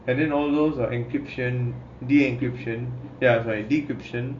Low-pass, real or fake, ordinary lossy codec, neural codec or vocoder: 7.2 kHz; real; MP3, 48 kbps; none